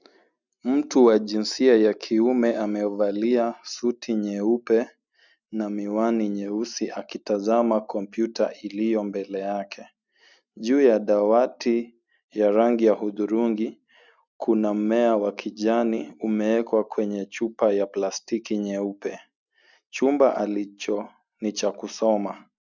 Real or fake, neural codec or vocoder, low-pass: real; none; 7.2 kHz